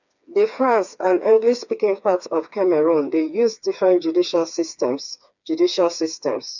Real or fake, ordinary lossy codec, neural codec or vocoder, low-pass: fake; none; codec, 16 kHz, 4 kbps, FreqCodec, smaller model; 7.2 kHz